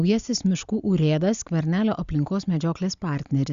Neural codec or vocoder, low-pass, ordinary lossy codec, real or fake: none; 7.2 kHz; Opus, 64 kbps; real